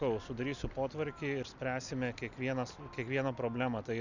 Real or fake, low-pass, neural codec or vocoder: real; 7.2 kHz; none